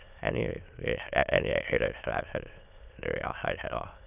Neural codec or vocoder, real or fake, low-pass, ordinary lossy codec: autoencoder, 22.05 kHz, a latent of 192 numbers a frame, VITS, trained on many speakers; fake; 3.6 kHz; none